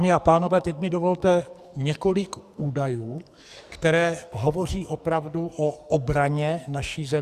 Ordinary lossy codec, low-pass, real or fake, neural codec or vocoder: Opus, 64 kbps; 14.4 kHz; fake; codec, 44.1 kHz, 2.6 kbps, SNAC